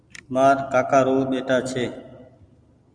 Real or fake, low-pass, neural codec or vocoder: real; 9.9 kHz; none